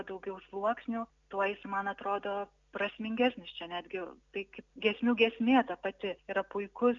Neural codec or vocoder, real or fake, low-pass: none; real; 7.2 kHz